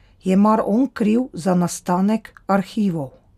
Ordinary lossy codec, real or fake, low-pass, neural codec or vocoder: none; real; 14.4 kHz; none